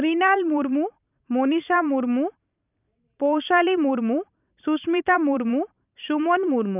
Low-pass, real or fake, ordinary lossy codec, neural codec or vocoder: 3.6 kHz; fake; none; vocoder, 44.1 kHz, 128 mel bands every 512 samples, BigVGAN v2